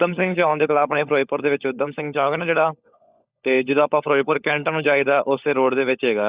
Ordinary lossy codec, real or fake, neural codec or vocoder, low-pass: Opus, 64 kbps; fake; codec, 16 kHz, 16 kbps, FunCodec, trained on LibriTTS, 50 frames a second; 3.6 kHz